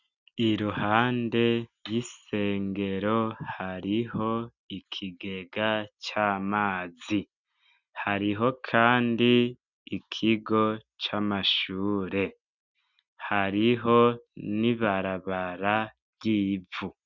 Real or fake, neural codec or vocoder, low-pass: real; none; 7.2 kHz